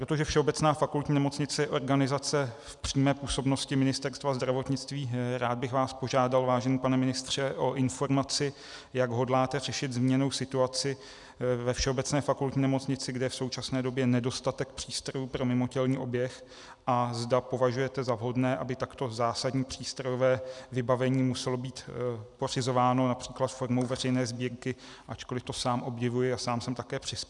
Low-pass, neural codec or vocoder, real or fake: 10.8 kHz; none; real